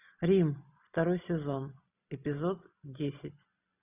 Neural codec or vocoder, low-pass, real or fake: none; 3.6 kHz; real